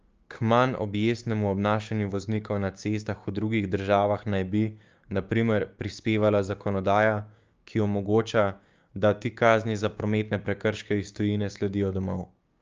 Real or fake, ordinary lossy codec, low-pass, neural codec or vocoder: fake; Opus, 24 kbps; 7.2 kHz; codec, 16 kHz, 6 kbps, DAC